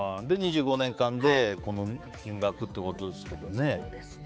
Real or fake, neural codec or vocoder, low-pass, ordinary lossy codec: fake; codec, 16 kHz, 4 kbps, X-Codec, HuBERT features, trained on balanced general audio; none; none